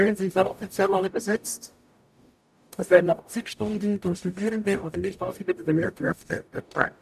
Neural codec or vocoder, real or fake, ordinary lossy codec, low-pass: codec, 44.1 kHz, 0.9 kbps, DAC; fake; none; 14.4 kHz